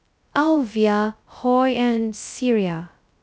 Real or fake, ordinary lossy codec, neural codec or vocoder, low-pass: fake; none; codec, 16 kHz, 0.2 kbps, FocalCodec; none